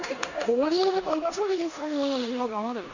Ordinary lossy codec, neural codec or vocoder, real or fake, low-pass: none; codec, 16 kHz in and 24 kHz out, 0.9 kbps, LongCat-Audio-Codec, four codebook decoder; fake; 7.2 kHz